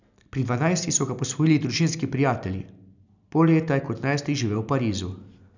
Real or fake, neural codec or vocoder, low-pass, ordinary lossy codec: real; none; 7.2 kHz; none